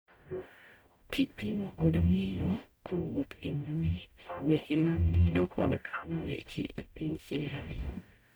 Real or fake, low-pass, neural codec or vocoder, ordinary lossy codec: fake; none; codec, 44.1 kHz, 0.9 kbps, DAC; none